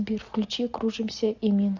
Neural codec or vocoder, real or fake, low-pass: none; real; 7.2 kHz